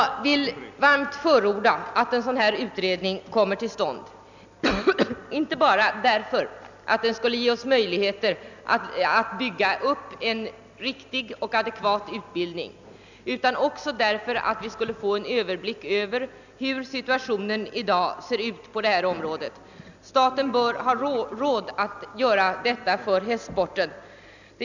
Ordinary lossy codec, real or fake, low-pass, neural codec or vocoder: none; real; 7.2 kHz; none